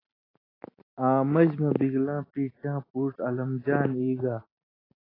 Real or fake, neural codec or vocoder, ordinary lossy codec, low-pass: real; none; AAC, 24 kbps; 5.4 kHz